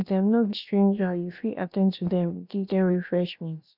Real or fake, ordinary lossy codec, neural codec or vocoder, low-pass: fake; none; codec, 16 kHz, about 1 kbps, DyCAST, with the encoder's durations; 5.4 kHz